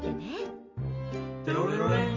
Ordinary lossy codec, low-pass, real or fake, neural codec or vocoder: MP3, 48 kbps; 7.2 kHz; real; none